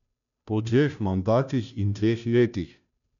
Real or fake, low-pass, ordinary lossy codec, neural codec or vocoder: fake; 7.2 kHz; none; codec, 16 kHz, 0.5 kbps, FunCodec, trained on Chinese and English, 25 frames a second